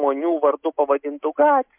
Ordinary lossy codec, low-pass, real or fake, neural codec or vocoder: MP3, 32 kbps; 3.6 kHz; real; none